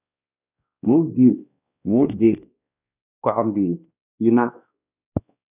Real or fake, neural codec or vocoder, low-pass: fake; codec, 16 kHz, 1 kbps, X-Codec, WavLM features, trained on Multilingual LibriSpeech; 3.6 kHz